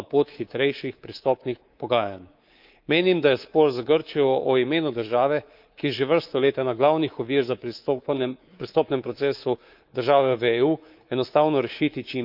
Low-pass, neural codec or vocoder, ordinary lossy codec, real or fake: 5.4 kHz; codec, 24 kHz, 3.1 kbps, DualCodec; Opus, 24 kbps; fake